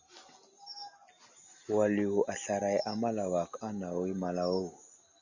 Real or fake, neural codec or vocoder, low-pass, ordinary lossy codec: real; none; 7.2 kHz; Opus, 64 kbps